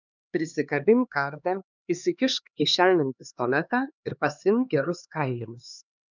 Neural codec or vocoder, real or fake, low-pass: codec, 16 kHz, 2 kbps, X-Codec, HuBERT features, trained on LibriSpeech; fake; 7.2 kHz